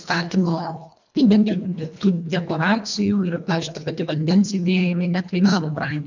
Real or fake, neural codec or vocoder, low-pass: fake; codec, 24 kHz, 1.5 kbps, HILCodec; 7.2 kHz